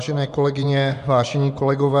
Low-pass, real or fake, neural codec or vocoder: 10.8 kHz; fake; vocoder, 24 kHz, 100 mel bands, Vocos